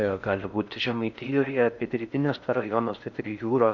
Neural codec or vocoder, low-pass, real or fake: codec, 16 kHz in and 24 kHz out, 0.6 kbps, FocalCodec, streaming, 4096 codes; 7.2 kHz; fake